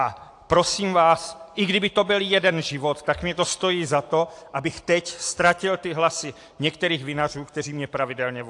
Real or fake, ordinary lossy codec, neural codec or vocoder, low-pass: real; AAC, 64 kbps; none; 10.8 kHz